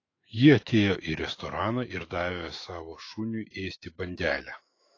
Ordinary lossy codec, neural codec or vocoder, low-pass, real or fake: AAC, 32 kbps; autoencoder, 48 kHz, 128 numbers a frame, DAC-VAE, trained on Japanese speech; 7.2 kHz; fake